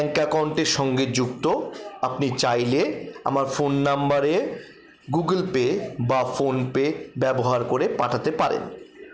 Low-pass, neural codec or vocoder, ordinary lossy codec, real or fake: none; none; none; real